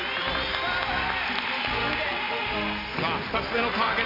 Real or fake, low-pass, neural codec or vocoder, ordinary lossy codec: real; 5.4 kHz; none; MP3, 32 kbps